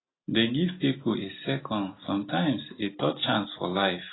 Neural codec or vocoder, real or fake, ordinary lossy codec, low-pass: none; real; AAC, 16 kbps; 7.2 kHz